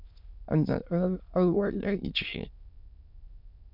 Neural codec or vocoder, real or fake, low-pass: autoencoder, 22.05 kHz, a latent of 192 numbers a frame, VITS, trained on many speakers; fake; 5.4 kHz